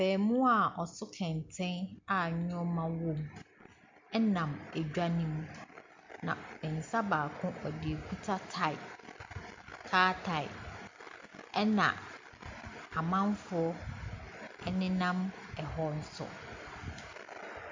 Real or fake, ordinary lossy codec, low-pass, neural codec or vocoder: real; MP3, 64 kbps; 7.2 kHz; none